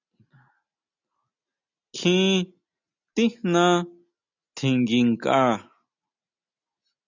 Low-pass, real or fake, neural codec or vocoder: 7.2 kHz; real; none